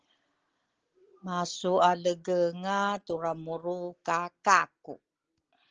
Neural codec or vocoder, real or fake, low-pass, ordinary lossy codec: none; real; 7.2 kHz; Opus, 16 kbps